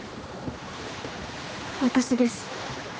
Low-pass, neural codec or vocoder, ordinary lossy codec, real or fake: none; codec, 16 kHz, 2 kbps, X-Codec, HuBERT features, trained on general audio; none; fake